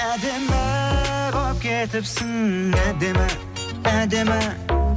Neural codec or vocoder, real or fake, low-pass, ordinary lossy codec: none; real; none; none